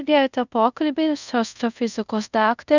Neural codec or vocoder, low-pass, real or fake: codec, 24 kHz, 0.5 kbps, DualCodec; 7.2 kHz; fake